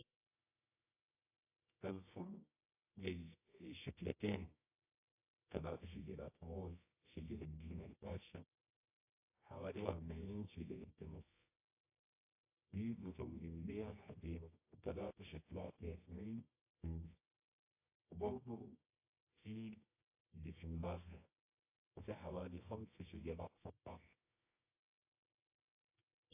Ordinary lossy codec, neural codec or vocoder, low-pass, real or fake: AAC, 24 kbps; codec, 24 kHz, 0.9 kbps, WavTokenizer, medium music audio release; 3.6 kHz; fake